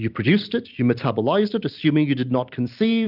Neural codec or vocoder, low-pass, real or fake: none; 5.4 kHz; real